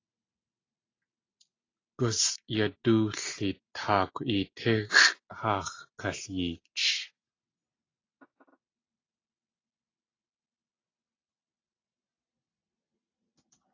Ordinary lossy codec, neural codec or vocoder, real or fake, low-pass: AAC, 32 kbps; none; real; 7.2 kHz